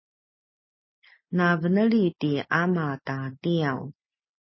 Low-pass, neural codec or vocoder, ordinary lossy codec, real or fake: 7.2 kHz; none; MP3, 24 kbps; real